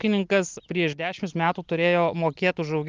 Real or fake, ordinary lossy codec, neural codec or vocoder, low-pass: real; Opus, 32 kbps; none; 7.2 kHz